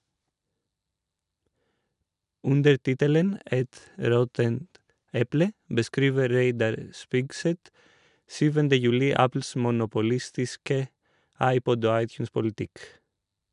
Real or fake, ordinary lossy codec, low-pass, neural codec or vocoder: real; none; 10.8 kHz; none